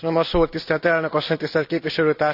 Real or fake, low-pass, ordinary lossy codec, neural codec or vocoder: real; 5.4 kHz; none; none